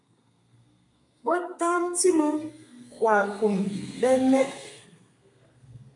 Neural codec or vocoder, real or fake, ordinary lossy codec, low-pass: codec, 32 kHz, 1.9 kbps, SNAC; fake; MP3, 96 kbps; 10.8 kHz